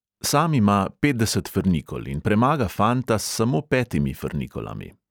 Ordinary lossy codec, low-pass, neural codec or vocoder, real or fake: none; none; none; real